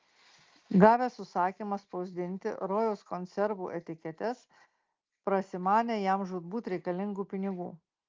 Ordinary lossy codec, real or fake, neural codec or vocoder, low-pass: Opus, 32 kbps; real; none; 7.2 kHz